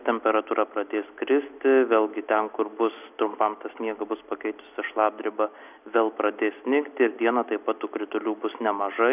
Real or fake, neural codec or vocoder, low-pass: real; none; 3.6 kHz